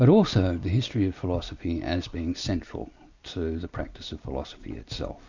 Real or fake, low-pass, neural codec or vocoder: real; 7.2 kHz; none